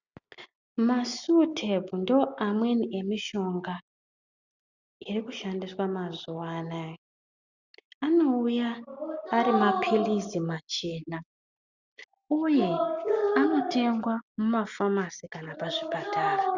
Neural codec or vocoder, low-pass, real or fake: none; 7.2 kHz; real